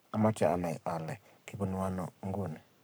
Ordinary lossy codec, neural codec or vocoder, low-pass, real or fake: none; codec, 44.1 kHz, 7.8 kbps, Pupu-Codec; none; fake